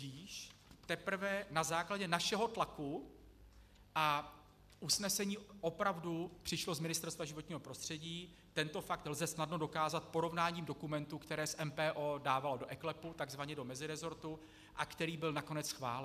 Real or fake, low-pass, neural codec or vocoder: real; 14.4 kHz; none